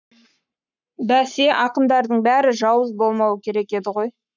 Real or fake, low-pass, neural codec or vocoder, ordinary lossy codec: fake; 7.2 kHz; codec, 44.1 kHz, 7.8 kbps, Pupu-Codec; none